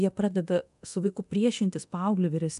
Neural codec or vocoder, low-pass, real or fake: codec, 24 kHz, 0.9 kbps, DualCodec; 10.8 kHz; fake